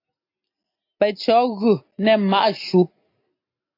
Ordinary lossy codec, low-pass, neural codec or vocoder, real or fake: AAC, 32 kbps; 5.4 kHz; none; real